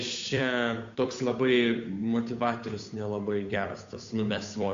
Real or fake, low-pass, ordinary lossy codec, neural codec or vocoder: fake; 7.2 kHz; AAC, 64 kbps; codec, 16 kHz, 8 kbps, FunCodec, trained on Chinese and English, 25 frames a second